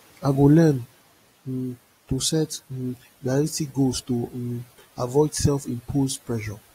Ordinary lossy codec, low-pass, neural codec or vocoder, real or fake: AAC, 48 kbps; 19.8 kHz; none; real